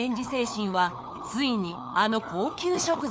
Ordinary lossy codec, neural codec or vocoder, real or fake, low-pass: none; codec, 16 kHz, 4 kbps, FunCodec, trained on LibriTTS, 50 frames a second; fake; none